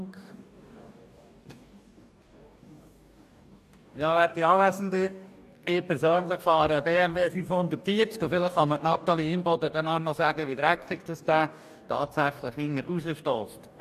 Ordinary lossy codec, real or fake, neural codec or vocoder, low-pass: AAC, 96 kbps; fake; codec, 44.1 kHz, 2.6 kbps, DAC; 14.4 kHz